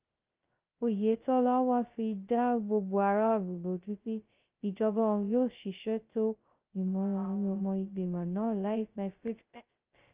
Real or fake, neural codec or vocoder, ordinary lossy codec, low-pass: fake; codec, 16 kHz, 0.2 kbps, FocalCodec; Opus, 24 kbps; 3.6 kHz